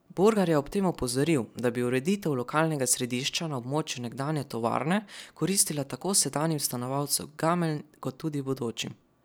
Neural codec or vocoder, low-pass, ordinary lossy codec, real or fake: none; none; none; real